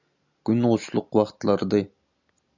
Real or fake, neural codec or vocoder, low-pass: real; none; 7.2 kHz